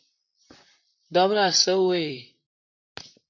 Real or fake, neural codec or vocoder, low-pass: fake; vocoder, 44.1 kHz, 128 mel bands, Pupu-Vocoder; 7.2 kHz